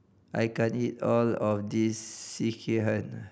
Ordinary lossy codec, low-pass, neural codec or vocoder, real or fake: none; none; none; real